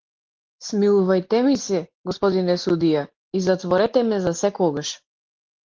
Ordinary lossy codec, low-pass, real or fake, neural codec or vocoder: Opus, 16 kbps; 7.2 kHz; real; none